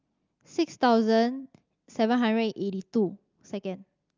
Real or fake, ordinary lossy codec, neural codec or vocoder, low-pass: real; Opus, 24 kbps; none; 7.2 kHz